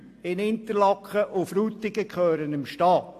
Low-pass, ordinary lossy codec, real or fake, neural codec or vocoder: 14.4 kHz; AAC, 64 kbps; real; none